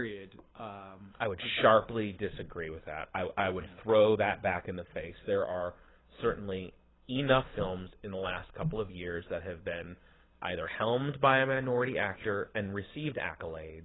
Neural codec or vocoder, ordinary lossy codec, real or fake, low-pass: codec, 24 kHz, 6 kbps, HILCodec; AAC, 16 kbps; fake; 7.2 kHz